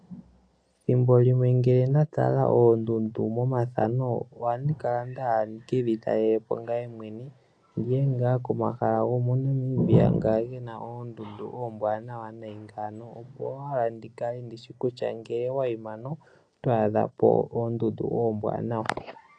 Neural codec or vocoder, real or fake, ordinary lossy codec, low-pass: none; real; AAC, 48 kbps; 9.9 kHz